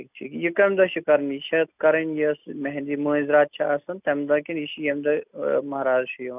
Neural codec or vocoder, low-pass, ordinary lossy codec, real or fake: none; 3.6 kHz; none; real